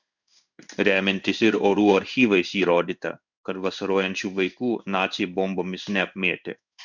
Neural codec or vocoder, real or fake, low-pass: codec, 16 kHz in and 24 kHz out, 1 kbps, XY-Tokenizer; fake; 7.2 kHz